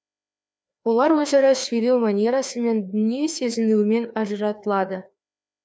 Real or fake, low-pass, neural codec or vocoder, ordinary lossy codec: fake; none; codec, 16 kHz, 2 kbps, FreqCodec, larger model; none